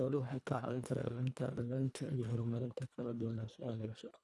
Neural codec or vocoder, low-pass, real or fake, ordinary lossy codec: codec, 24 kHz, 1.5 kbps, HILCodec; none; fake; none